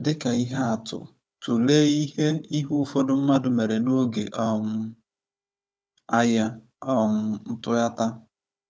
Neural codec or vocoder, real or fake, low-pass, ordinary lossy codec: codec, 16 kHz, 4 kbps, FunCodec, trained on Chinese and English, 50 frames a second; fake; none; none